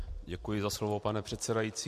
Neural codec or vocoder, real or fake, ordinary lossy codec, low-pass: none; real; MP3, 64 kbps; 14.4 kHz